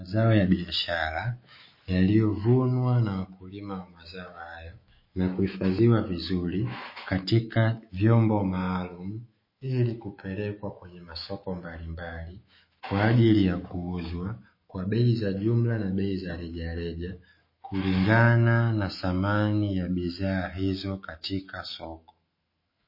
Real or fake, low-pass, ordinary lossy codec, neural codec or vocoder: fake; 5.4 kHz; MP3, 24 kbps; autoencoder, 48 kHz, 128 numbers a frame, DAC-VAE, trained on Japanese speech